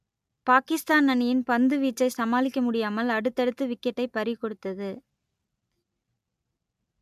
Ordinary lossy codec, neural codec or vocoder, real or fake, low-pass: MP3, 96 kbps; none; real; 14.4 kHz